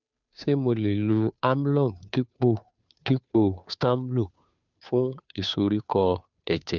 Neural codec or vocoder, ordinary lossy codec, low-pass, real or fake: codec, 16 kHz, 2 kbps, FunCodec, trained on Chinese and English, 25 frames a second; none; 7.2 kHz; fake